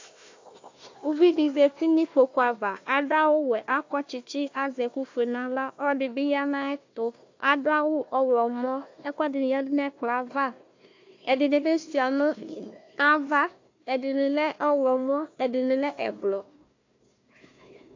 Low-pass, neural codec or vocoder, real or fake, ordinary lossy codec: 7.2 kHz; codec, 16 kHz, 1 kbps, FunCodec, trained on Chinese and English, 50 frames a second; fake; AAC, 48 kbps